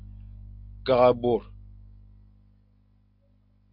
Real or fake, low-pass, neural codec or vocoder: real; 5.4 kHz; none